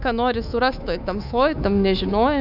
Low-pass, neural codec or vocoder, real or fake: 5.4 kHz; codec, 24 kHz, 3.1 kbps, DualCodec; fake